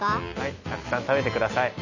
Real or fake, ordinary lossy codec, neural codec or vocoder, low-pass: real; none; none; 7.2 kHz